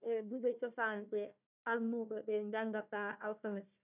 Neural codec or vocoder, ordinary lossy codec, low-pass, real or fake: codec, 16 kHz, 1 kbps, FunCodec, trained on Chinese and English, 50 frames a second; none; 3.6 kHz; fake